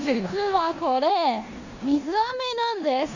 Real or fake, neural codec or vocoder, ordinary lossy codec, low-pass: fake; codec, 16 kHz in and 24 kHz out, 0.9 kbps, LongCat-Audio-Codec, four codebook decoder; none; 7.2 kHz